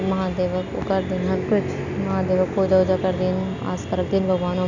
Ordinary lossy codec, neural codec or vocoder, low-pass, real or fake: none; none; 7.2 kHz; real